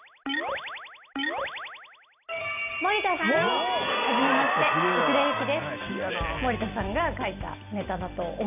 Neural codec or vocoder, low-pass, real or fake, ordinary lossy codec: none; 3.6 kHz; real; none